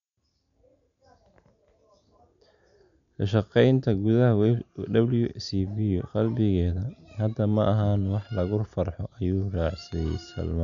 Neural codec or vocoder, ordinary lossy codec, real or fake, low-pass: none; none; real; 7.2 kHz